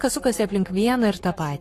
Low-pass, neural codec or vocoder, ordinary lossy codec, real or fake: 14.4 kHz; codec, 44.1 kHz, 7.8 kbps, Pupu-Codec; AAC, 48 kbps; fake